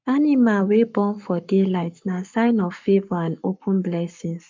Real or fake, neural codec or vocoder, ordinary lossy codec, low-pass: fake; codec, 44.1 kHz, 7.8 kbps, Pupu-Codec; MP3, 64 kbps; 7.2 kHz